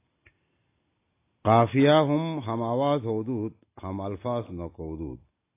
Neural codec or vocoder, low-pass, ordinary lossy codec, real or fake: none; 3.6 kHz; AAC, 24 kbps; real